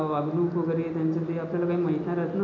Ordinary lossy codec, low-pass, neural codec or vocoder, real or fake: none; 7.2 kHz; none; real